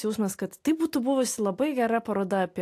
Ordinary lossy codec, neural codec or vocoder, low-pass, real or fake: AAC, 64 kbps; none; 14.4 kHz; real